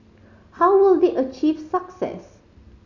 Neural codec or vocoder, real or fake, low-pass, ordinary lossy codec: none; real; 7.2 kHz; none